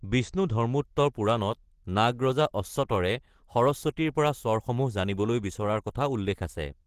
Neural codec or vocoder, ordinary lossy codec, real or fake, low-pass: none; Opus, 16 kbps; real; 14.4 kHz